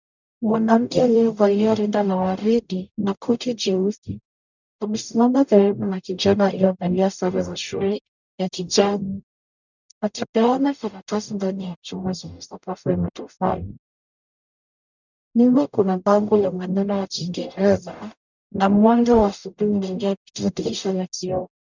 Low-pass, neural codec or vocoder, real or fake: 7.2 kHz; codec, 44.1 kHz, 0.9 kbps, DAC; fake